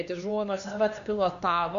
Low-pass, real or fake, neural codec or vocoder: 7.2 kHz; fake; codec, 16 kHz, 2 kbps, X-Codec, HuBERT features, trained on LibriSpeech